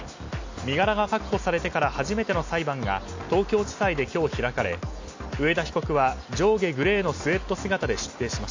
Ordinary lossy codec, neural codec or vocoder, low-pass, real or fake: AAC, 48 kbps; none; 7.2 kHz; real